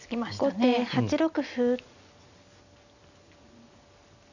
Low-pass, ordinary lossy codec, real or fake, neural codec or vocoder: 7.2 kHz; none; real; none